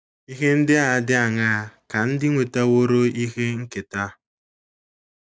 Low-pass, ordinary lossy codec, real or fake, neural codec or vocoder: none; none; real; none